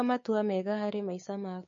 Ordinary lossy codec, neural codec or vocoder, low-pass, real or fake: MP3, 32 kbps; codec, 16 kHz, 6 kbps, DAC; 7.2 kHz; fake